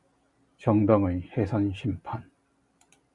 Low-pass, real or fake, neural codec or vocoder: 10.8 kHz; real; none